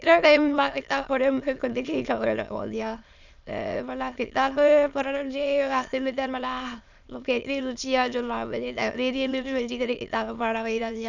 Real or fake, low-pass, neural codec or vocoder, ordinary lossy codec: fake; 7.2 kHz; autoencoder, 22.05 kHz, a latent of 192 numbers a frame, VITS, trained on many speakers; none